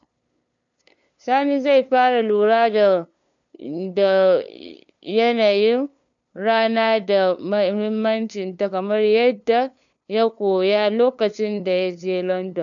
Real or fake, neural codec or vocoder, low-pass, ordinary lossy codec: fake; codec, 16 kHz, 2 kbps, FunCodec, trained on LibriTTS, 25 frames a second; 7.2 kHz; none